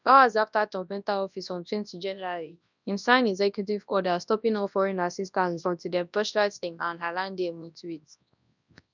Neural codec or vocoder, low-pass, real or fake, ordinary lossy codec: codec, 24 kHz, 0.9 kbps, WavTokenizer, large speech release; 7.2 kHz; fake; none